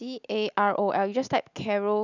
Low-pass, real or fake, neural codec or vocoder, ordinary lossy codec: 7.2 kHz; real; none; none